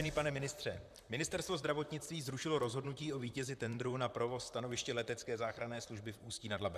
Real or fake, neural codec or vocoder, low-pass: fake; vocoder, 44.1 kHz, 128 mel bands, Pupu-Vocoder; 14.4 kHz